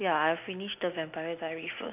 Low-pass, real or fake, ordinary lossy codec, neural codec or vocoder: 3.6 kHz; real; none; none